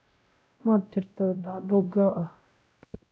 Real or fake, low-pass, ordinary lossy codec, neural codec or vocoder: fake; none; none; codec, 16 kHz, 0.5 kbps, X-Codec, WavLM features, trained on Multilingual LibriSpeech